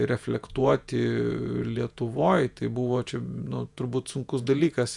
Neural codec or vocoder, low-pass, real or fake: vocoder, 48 kHz, 128 mel bands, Vocos; 10.8 kHz; fake